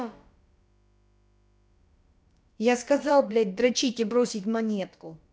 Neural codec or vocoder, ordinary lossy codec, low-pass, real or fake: codec, 16 kHz, about 1 kbps, DyCAST, with the encoder's durations; none; none; fake